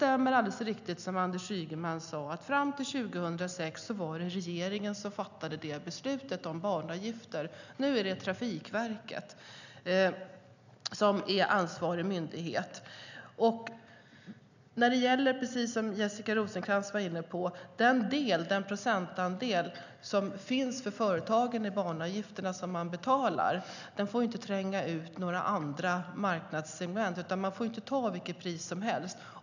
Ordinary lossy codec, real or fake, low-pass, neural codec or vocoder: none; real; 7.2 kHz; none